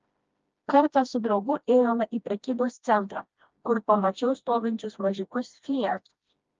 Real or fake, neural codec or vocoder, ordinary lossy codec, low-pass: fake; codec, 16 kHz, 1 kbps, FreqCodec, smaller model; Opus, 24 kbps; 7.2 kHz